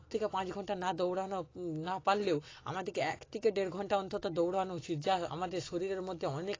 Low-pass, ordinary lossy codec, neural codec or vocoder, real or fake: 7.2 kHz; AAC, 32 kbps; none; real